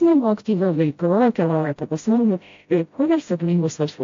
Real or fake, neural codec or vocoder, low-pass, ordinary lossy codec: fake; codec, 16 kHz, 0.5 kbps, FreqCodec, smaller model; 7.2 kHz; AAC, 48 kbps